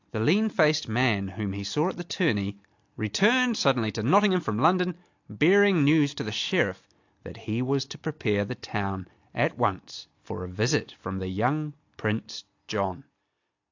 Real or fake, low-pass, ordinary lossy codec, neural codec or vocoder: real; 7.2 kHz; AAC, 48 kbps; none